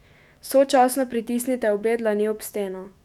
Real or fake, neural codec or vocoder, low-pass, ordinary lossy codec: fake; autoencoder, 48 kHz, 128 numbers a frame, DAC-VAE, trained on Japanese speech; 19.8 kHz; none